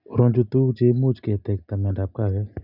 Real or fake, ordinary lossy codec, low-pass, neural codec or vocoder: real; none; 5.4 kHz; none